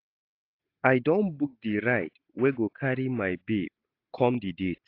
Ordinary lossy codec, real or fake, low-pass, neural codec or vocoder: AAC, 32 kbps; real; 5.4 kHz; none